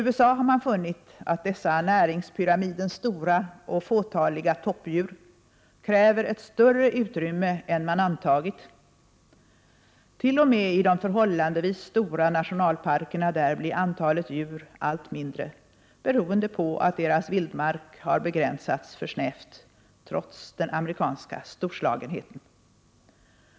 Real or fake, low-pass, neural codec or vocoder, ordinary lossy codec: real; none; none; none